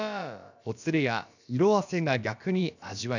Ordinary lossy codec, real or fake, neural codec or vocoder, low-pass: none; fake; codec, 16 kHz, about 1 kbps, DyCAST, with the encoder's durations; 7.2 kHz